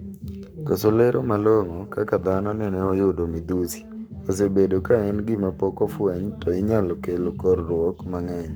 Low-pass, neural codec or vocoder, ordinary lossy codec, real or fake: none; codec, 44.1 kHz, 7.8 kbps, Pupu-Codec; none; fake